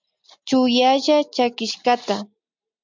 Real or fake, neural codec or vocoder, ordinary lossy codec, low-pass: real; none; MP3, 64 kbps; 7.2 kHz